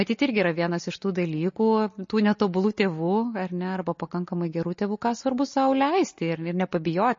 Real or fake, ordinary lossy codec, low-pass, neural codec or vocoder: real; MP3, 32 kbps; 7.2 kHz; none